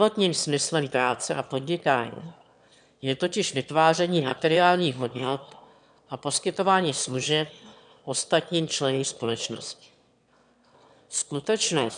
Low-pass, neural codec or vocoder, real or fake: 9.9 kHz; autoencoder, 22.05 kHz, a latent of 192 numbers a frame, VITS, trained on one speaker; fake